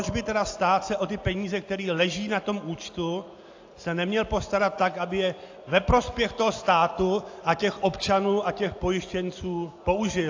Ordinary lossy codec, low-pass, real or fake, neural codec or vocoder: AAC, 48 kbps; 7.2 kHz; real; none